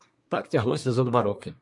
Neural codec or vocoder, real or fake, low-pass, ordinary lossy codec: codec, 24 kHz, 1 kbps, SNAC; fake; 10.8 kHz; MP3, 64 kbps